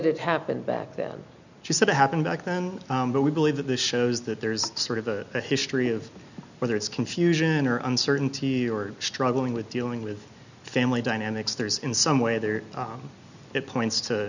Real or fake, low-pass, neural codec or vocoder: real; 7.2 kHz; none